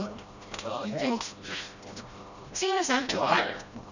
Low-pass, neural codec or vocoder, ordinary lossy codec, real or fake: 7.2 kHz; codec, 16 kHz, 1 kbps, FreqCodec, smaller model; none; fake